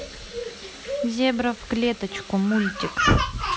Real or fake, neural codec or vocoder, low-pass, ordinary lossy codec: real; none; none; none